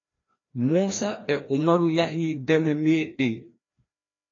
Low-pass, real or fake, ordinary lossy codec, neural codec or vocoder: 7.2 kHz; fake; AAC, 32 kbps; codec, 16 kHz, 1 kbps, FreqCodec, larger model